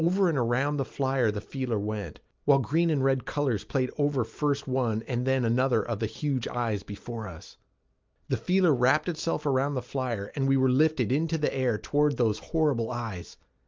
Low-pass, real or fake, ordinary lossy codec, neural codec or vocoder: 7.2 kHz; real; Opus, 24 kbps; none